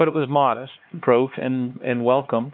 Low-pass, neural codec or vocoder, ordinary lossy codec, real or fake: 5.4 kHz; codec, 16 kHz, 2 kbps, X-Codec, HuBERT features, trained on LibriSpeech; AAC, 48 kbps; fake